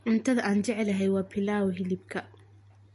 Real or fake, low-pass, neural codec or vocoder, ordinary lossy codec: real; 14.4 kHz; none; MP3, 48 kbps